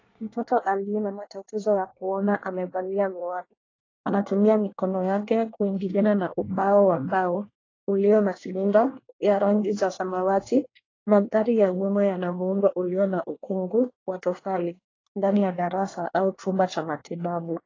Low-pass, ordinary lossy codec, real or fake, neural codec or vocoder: 7.2 kHz; AAC, 32 kbps; fake; codec, 24 kHz, 1 kbps, SNAC